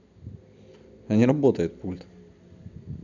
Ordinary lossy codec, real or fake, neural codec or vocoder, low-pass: none; real; none; 7.2 kHz